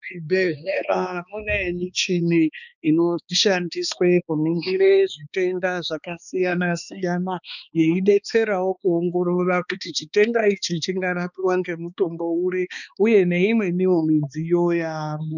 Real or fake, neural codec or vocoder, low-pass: fake; codec, 16 kHz, 2 kbps, X-Codec, HuBERT features, trained on balanced general audio; 7.2 kHz